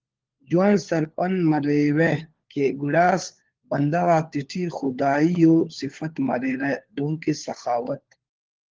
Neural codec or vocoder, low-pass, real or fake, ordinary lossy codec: codec, 16 kHz, 4 kbps, FunCodec, trained on LibriTTS, 50 frames a second; 7.2 kHz; fake; Opus, 16 kbps